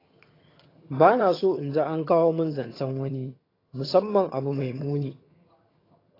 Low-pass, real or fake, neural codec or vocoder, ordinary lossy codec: 5.4 kHz; fake; vocoder, 22.05 kHz, 80 mel bands, HiFi-GAN; AAC, 24 kbps